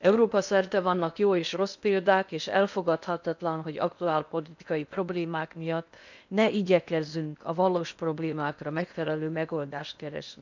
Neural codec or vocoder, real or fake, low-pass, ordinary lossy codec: codec, 16 kHz in and 24 kHz out, 0.8 kbps, FocalCodec, streaming, 65536 codes; fake; 7.2 kHz; none